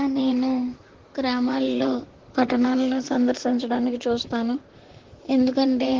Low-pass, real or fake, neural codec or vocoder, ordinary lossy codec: 7.2 kHz; fake; vocoder, 44.1 kHz, 128 mel bands, Pupu-Vocoder; Opus, 16 kbps